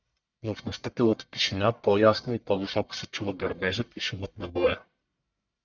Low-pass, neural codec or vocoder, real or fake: 7.2 kHz; codec, 44.1 kHz, 1.7 kbps, Pupu-Codec; fake